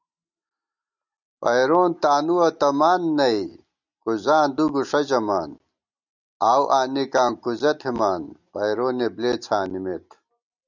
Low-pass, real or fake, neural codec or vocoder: 7.2 kHz; real; none